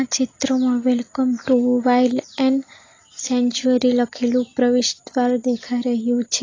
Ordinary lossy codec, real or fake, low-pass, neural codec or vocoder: AAC, 32 kbps; real; 7.2 kHz; none